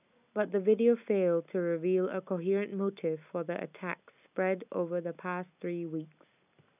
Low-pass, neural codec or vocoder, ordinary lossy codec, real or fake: 3.6 kHz; none; none; real